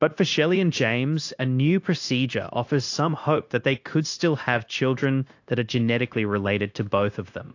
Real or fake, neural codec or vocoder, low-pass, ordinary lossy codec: fake; codec, 16 kHz, 0.9 kbps, LongCat-Audio-Codec; 7.2 kHz; AAC, 48 kbps